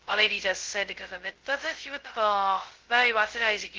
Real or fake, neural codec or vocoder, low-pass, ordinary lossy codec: fake; codec, 16 kHz, 0.2 kbps, FocalCodec; 7.2 kHz; Opus, 16 kbps